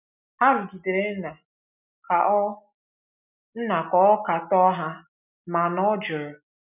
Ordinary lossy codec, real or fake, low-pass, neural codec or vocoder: none; real; 3.6 kHz; none